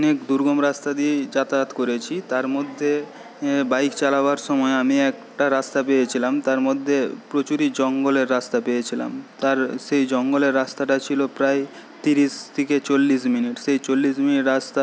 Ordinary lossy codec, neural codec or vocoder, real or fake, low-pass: none; none; real; none